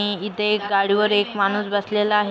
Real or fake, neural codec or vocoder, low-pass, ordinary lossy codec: real; none; none; none